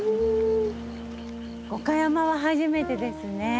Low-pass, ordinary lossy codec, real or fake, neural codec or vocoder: none; none; real; none